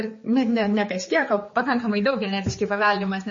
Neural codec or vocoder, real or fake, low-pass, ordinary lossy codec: codec, 16 kHz, 4 kbps, X-Codec, HuBERT features, trained on general audio; fake; 7.2 kHz; MP3, 32 kbps